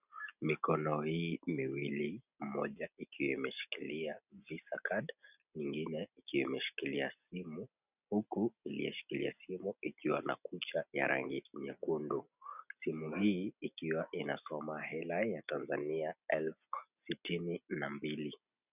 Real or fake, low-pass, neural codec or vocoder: real; 3.6 kHz; none